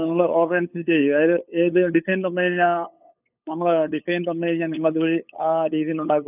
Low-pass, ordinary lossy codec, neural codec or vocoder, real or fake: 3.6 kHz; none; codec, 16 kHz, 8 kbps, FunCodec, trained on LibriTTS, 25 frames a second; fake